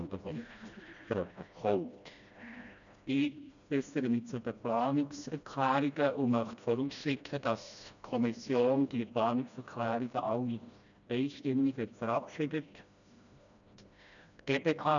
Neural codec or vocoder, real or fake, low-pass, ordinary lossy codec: codec, 16 kHz, 1 kbps, FreqCodec, smaller model; fake; 7.2 kHz; none